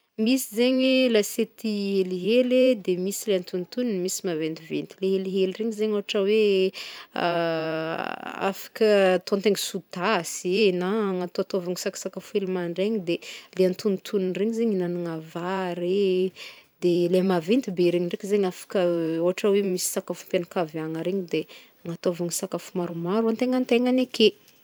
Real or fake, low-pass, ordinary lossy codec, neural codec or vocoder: fake; none; none; vocoder, 44.1 kHz, 128 mel bands every 512 samples, BigVGAN v2